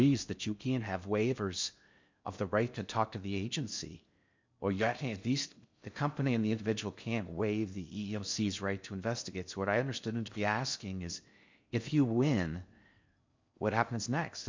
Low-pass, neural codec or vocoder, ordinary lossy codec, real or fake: 7.2 kHz; codec, 16 kHz in and 24 kHz out, 0.6 kbps, FocalCodec, streaming, 4096 codes; MP3, 64 kbps; fake